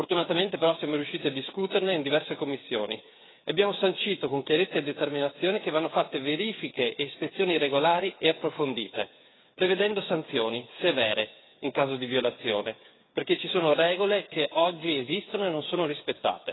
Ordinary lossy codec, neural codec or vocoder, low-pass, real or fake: AAC, 16 kbps; codec, 16 kHz, 8 kbps, FreqCodec, smaller model; 7.2 kHz; fake